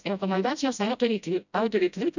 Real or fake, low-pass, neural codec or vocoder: fake; 7.2 kHz; codec, 16 kHz, 0.5 kbps, FreqCodec, smaller model